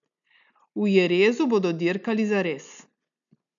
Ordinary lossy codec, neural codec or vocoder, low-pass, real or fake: none; none; 7.2 kHz; real